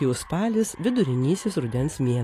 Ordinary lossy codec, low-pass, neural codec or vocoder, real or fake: AAC, 48 kbps; 14.4 kHz; none; real